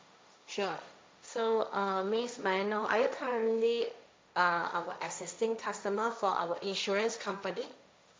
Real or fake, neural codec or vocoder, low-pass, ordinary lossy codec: fake; codec, 16 kHz, 1.1 kbps, Voila-Tokenizer; none; none